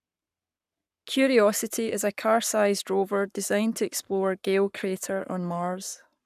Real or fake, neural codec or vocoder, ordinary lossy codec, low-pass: fake; codec, 44.1 kHz, 7.8 kbps, Pupu-Codec; none; 14.4 kHz